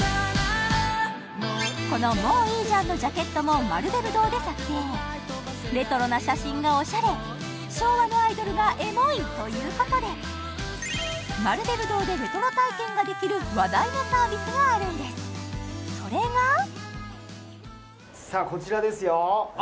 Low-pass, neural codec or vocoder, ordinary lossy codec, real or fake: none; none; none; real